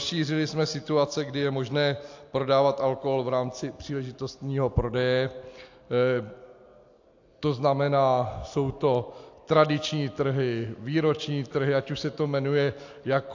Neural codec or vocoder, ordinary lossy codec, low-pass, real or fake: none; AAC, 48 kbps; 7.2 kHz; real